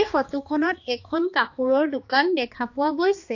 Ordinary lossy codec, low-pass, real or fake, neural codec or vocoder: AAC, 48 kbps; 7.2 kHz; fake; codec, 16 kHz, 2 kbps, X-Codec, HuBERT features, trained on balanced general audio